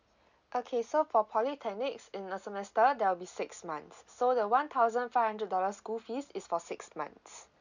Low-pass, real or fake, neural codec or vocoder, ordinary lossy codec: 7.2 kHz; real; none; none